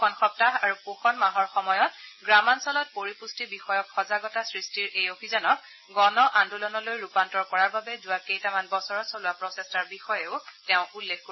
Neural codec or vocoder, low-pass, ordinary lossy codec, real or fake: none; 7.2 kHz; MP3, 24 kbps; real